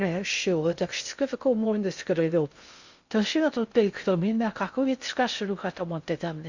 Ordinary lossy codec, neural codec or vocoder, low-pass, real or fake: Opus, 64 kbps; codec, 16 kHz in and 24 kHz out, 0.6 kbps, FocalCodec, streaming, 2048 codes; 7.2 kHz; fake